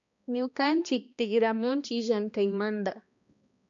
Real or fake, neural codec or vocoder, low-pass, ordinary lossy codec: fake; codec, 16 kHz, 1 kbps, X-Codec, HuBERT features, trained on balanced general audio; 7.2 kHz; AAC, 64 kbps